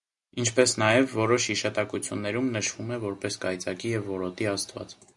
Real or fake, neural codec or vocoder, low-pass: real; none; 10.8 kHz